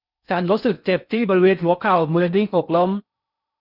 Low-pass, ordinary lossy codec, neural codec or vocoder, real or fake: 5.4 kHz; none; codec, 16 kHz in and 24 kHz out, 0.6 kbps, FocalCodec, streaming, 4096 codes; fake